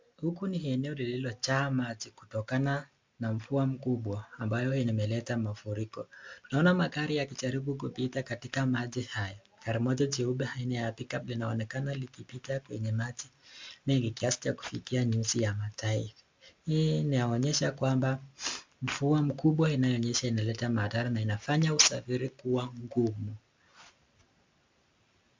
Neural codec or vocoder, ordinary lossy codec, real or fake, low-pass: none; MP3, 64 kbps; real; 7.2 kHz